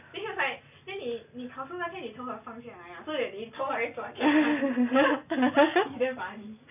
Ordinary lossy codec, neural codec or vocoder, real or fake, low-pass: none; none; real; 3.6 kHz